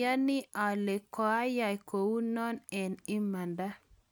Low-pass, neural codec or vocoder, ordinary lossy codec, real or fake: none; none; none; real